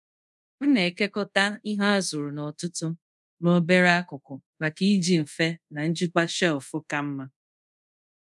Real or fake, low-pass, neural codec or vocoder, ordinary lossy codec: fake; none; codec, 24 kHz, 0.5 kbps, DualCodec; none